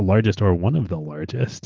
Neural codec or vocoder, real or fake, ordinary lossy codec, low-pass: none; real; Opus, 16 kbps; 7.2 kHz